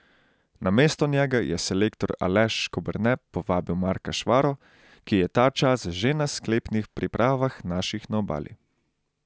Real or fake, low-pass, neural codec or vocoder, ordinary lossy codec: real; none; none; none